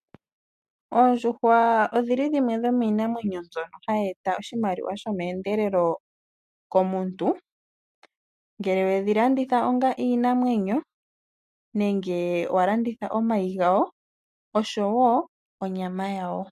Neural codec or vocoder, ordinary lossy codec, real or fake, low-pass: none; MP3, 64 kbps; real; 14.4 kHz